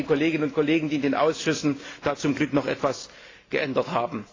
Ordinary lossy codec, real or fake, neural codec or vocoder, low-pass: AAC, 32 kbps; real; none; 7.2 kHz